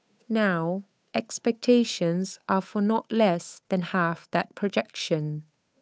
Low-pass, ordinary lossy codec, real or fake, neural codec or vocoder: none; none; fake; codec, 16 kHz, 8 kbps, FunCodec, trained on Chinese and English, 25 frames a second